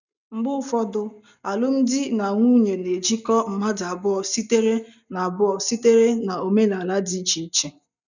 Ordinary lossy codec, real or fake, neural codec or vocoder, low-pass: none; real; none; 7.2 kHz